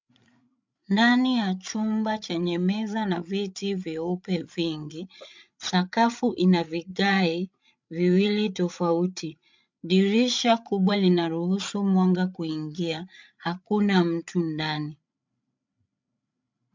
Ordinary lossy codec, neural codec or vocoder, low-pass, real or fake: MP3, 64 kbps; codec, 16 kHz, 8 kbps, FreqCodec, larger model; 7.2 kHz; fake